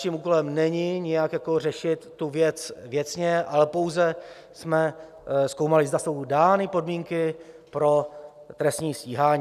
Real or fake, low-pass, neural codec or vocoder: real; 14.4 kHz; none